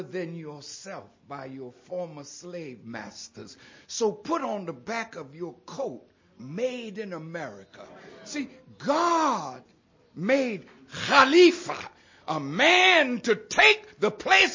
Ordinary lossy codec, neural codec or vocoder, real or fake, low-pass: MP3, 32 kbps; none; real; 7.2 kHz